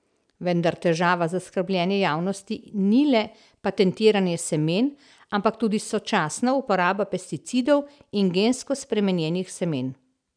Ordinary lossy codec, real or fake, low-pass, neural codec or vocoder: none; real; 9.9 kHz; none